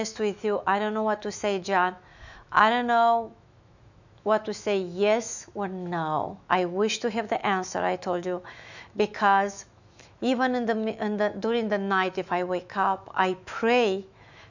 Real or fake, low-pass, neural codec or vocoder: fake; 7.2 kHz; autoencoder, 48 kHz, 128 numbers a frame, DAC-VAE, trained on Japanese speech